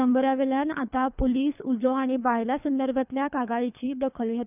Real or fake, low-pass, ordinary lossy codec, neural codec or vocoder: fake; 3.6 kHz; none; codec, 24 kHz, 3 kbps, HILCodec